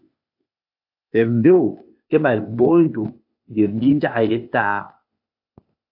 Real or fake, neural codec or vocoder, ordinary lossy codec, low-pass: fake; codec, 16 kHz, 0.8 kbps, ZipCodec; AAC, 48 kbps; 5.4 kHz